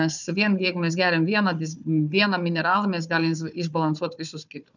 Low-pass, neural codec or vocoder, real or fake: 7.2 kHz; codec, 16 kHz, 4 kbps, FunCodec, trained on Chinese and English, 50 frames a second; fake